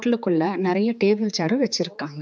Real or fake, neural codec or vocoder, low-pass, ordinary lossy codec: fake; codec, 16 kHz, 4 kbps, X-Codec, HuBERT features, trained on general audio; none; none